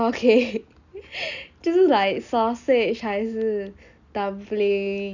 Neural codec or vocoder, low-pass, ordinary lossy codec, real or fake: none; 7.2 kHz; none; real